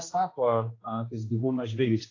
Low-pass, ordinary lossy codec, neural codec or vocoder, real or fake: 7.2 kHz; AAC, 32 kbps; codec, 16 kHz, 1 kbps, X-Codec, HuBERT features, trained on general audio; fake